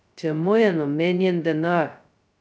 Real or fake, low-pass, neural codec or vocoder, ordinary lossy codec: fake; none; codec, 16 kHz, 0.2 kbps, FocalCodec; none